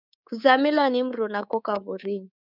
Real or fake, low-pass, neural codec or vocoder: fake; 5.4 kHz; codec, 24 kHz, 3.1 kbps, DualCodec